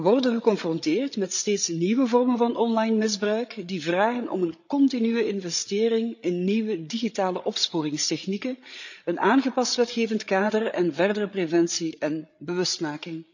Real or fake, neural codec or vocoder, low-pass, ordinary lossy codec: fake; codec, 16 kHz, 8 kbps, FreqCodec, larger model; 7.2 kHz; none